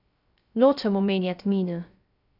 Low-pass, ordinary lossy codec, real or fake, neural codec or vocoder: 5.4 kHz; none; fake; codec, 16 kHz, 0.3 kbps, FocalCodec